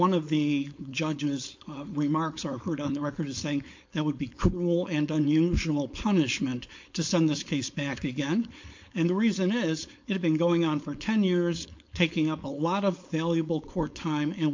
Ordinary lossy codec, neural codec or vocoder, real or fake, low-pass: MP3, 48 kbps; codec, 16 kHz, 4.8 kbps, FACodec; fake; 7.2 kHz